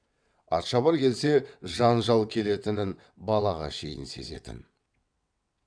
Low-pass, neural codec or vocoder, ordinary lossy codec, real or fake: 9.9 kHz; vocoder, 22.05 kHz, 80 mel bands, WaveNeXt; none; fake